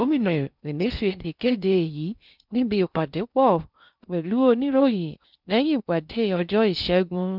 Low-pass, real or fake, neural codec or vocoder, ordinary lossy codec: 5.4 kHz; fake; codec, 16 kHz in and 24 kHz out, 0.6 kbps, FocalCodec, streaming, 2048 codes; none